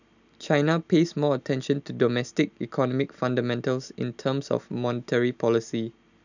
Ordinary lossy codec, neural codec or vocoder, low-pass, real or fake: none; none; 7.2 kHz; real